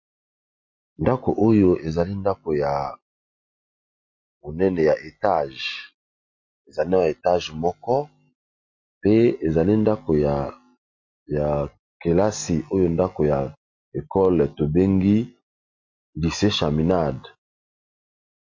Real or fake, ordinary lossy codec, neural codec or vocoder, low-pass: real; MP3, 48 kbps; none; 7.2 kHz